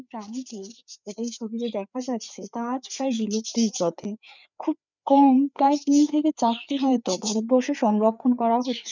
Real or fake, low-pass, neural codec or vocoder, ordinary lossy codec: fake; 7.2 kHz; codec, 16 kHz, 4 kbps, FreqCodec, larger model; none